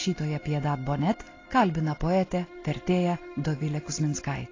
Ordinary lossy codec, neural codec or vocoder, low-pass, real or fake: AAC, 32 kbps; none; 7.2 kHz; real